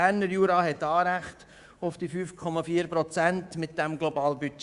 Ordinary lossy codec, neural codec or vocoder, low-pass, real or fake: Opus, 64 kbps; codec, 24 kHz, 3.1 kbps, DualCodec; 10.8 kHz; fake